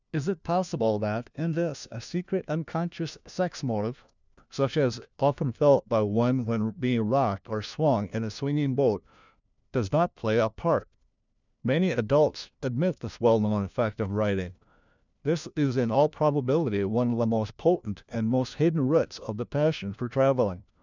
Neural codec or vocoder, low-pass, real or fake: codec, 16 kHz, 1 kbps, FunCodec, trained on LibriTTS, 50 frames a second; 7.2 kHz; fake